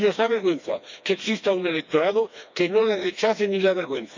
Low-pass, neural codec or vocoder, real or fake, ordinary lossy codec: 7.2 kHz; codec, 16 kHz, 2 kbps, FreqCodec, smaller model; fake; MP3, 64 kbps